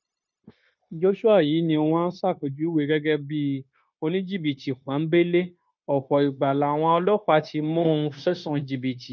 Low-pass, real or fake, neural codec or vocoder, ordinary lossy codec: 7.2 kHz; fake; codec, 16 kHz, 0.9 kbps, LongCat-Audio-Codec; none